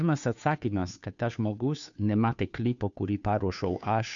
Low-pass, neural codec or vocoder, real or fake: 7.2 kHz; codec, 16 kHz, 2 kbps, FunCodec, trained on Chinese and English, 25 frames a second; fake